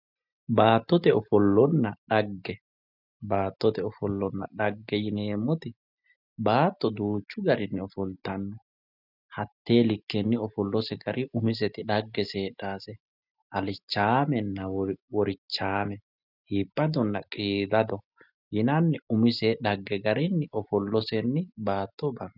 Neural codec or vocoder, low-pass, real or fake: none; 5.4 kHz; real